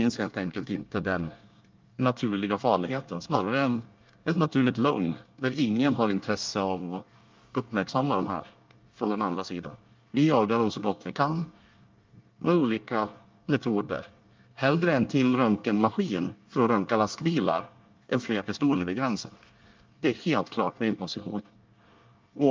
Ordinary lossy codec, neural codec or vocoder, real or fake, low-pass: Opus, 24 kbps; codec, 24 kHz, 1 kbps, SNAC; fake; 7.2 kHz